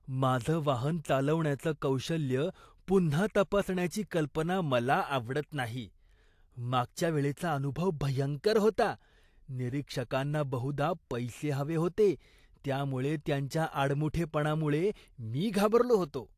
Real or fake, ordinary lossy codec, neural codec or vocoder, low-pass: real; AAC, 64 kbps; none; 14.4 kHz